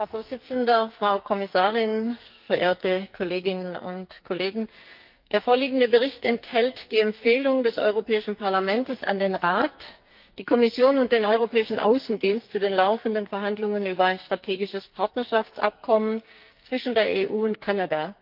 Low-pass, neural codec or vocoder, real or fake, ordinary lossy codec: 5.4 kHz; codec, 44.1 kHz, 2.6 kbps, SNAC; fake; Opus, 24 kbps